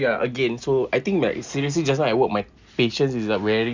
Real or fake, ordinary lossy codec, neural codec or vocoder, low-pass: real; Opus, 64 kbps; none; 7.2 kHz